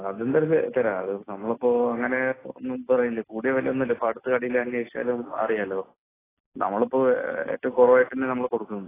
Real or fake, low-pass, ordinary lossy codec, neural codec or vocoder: real; 3.6 kHz; AAC, 16 kbps; none